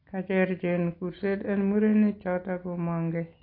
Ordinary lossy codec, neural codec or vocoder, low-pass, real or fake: AAC, 32 kbps; none; 5.4 kHz; real